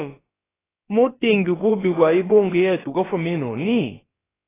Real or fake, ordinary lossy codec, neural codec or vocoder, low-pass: fake; AAC, 16 kbps; codec, 16 kHz, about 1 kbps, DyCAST, with the encoder's durations; 3.6 kHz